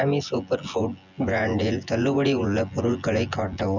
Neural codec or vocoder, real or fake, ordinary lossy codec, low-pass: vocoder, 24 kHz, 100 mel bands, Vocos; fake; none; 7.2 kHz